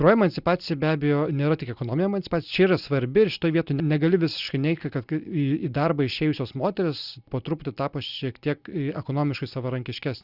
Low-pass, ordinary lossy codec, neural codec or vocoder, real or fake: 5.4 kHz; Opus, 64 kbps; none; real